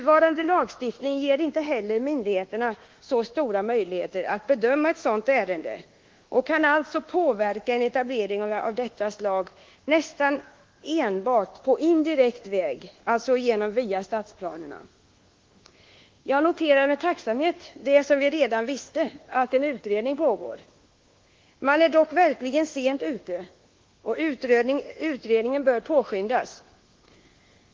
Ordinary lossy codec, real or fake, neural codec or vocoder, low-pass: Opus, 16 kbps; fake; codec, 24 kHz, 1.2 kbps, DualCodec; 7.2 kHz